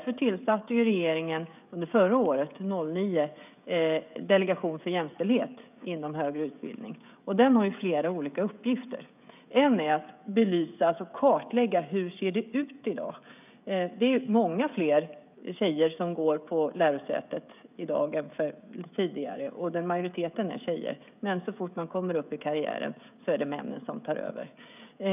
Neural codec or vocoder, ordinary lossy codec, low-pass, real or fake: codec, 16 kHz, 16 kbps, FreqCodec, smaller model; none; 3.6 kHz; fake